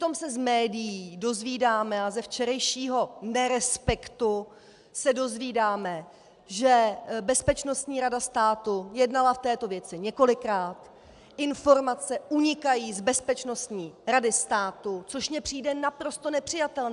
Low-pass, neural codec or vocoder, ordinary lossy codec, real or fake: 10.8 kHz; none; AAC, 96 kbps; real